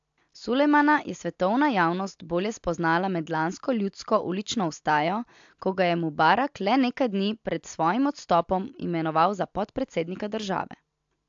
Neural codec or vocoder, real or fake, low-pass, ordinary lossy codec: none; real; 7.2 kHz; AAC, 64 kbps